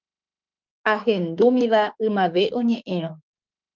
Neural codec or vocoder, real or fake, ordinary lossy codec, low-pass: autoencoder, 48 kHz, 32 numbers a frame, DAC-VAE, trained on Japanese speech; fake; Opus, 32 kbps; 7.2 kHz